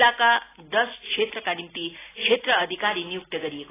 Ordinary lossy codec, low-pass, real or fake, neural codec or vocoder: AAC, 16 kbps; 3.6 kHz; real; none